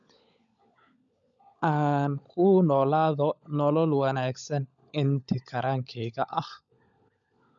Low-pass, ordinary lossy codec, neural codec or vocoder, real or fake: 7.2 kHz; none; codec, 16 kHz, 16 kbps, FunCodec, trained on LibriTTS, 50 frames a second; fake